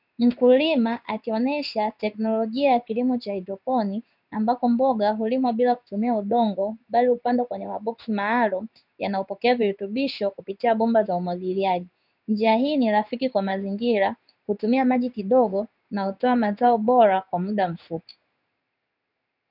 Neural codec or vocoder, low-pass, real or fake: codec, 16 kHz in and 24 kHz out, 1 kbps, XY-Tokenizer; 5.4 kHz; fake